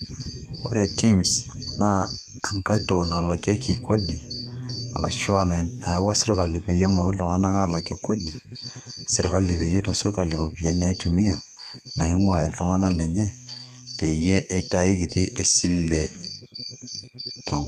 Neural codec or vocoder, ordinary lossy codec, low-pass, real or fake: codec, 32 kHz, 1.9 kbps, SNAC; none; 14.4 kHz; fake